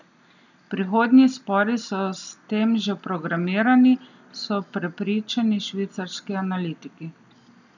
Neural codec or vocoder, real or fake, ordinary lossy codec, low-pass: none; real; none; 7.2 kHz